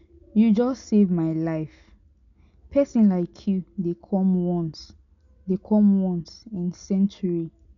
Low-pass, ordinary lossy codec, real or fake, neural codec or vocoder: 7.2 kHz; none; real; none